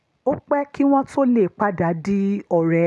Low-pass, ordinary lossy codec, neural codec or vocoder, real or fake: none; none; none; real